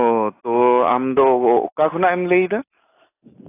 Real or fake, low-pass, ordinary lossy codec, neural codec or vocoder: real; 3.6 kHz; AAC, 24 kbps; none